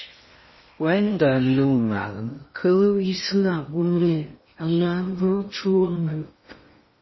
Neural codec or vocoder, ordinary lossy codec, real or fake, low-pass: codec, 16 kHz in and 24 kHz out, 0.6 kbps, FocalCodec, streaming, 2048 codes; MP3, 24 kbps; fake; 7.2 kHz